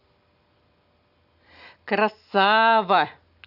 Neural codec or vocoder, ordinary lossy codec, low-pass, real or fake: none; none; 5.4 kHz; real